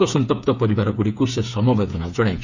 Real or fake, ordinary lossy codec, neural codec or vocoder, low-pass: fake; none; codec, 16 kHz, 8 kbps, FreqCodec, smaller model; 7.2 kHz